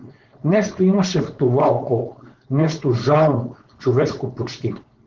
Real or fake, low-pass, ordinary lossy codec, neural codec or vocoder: fake; 7.2 kHz; Opus, 16 kbps; codec, 16 kHz, 4.8 kbps, FACodec